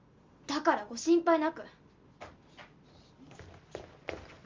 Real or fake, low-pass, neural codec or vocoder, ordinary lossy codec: real; 7.2 kHz; none; Opus, 32 kbps